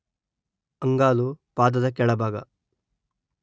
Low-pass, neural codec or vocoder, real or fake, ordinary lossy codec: none; none; real; none